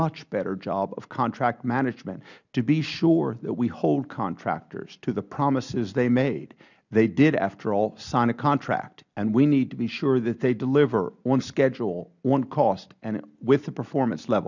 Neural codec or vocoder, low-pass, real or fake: none; 7.2 kHz; real